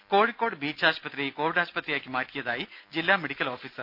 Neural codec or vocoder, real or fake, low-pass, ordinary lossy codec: none; real; 5.4 kHz; none